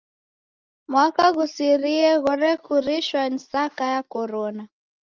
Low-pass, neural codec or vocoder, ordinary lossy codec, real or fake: 7.2 kHz; none; Opus, 32 kbps; real